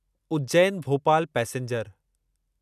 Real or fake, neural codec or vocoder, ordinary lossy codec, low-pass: real; none; none; 14.4 kHz